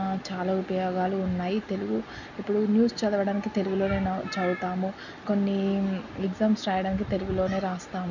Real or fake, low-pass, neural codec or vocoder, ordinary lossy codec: real; 7.2 kHz; none; none